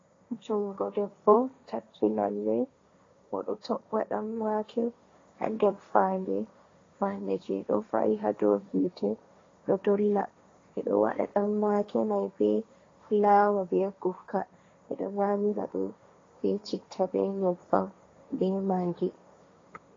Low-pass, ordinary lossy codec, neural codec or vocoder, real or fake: 7.2 kHz; AAC, 32 kbps; codec, 16 kHz, 1.1 kbps, Voila-Tokenizer; fake